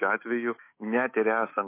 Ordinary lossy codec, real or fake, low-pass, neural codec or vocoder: MP3, 24 kbps; fake; 3.6 kHz; autoencoder, 48 kHz, 128 numbers a frame, DAC-VAE, trained on Japanese speech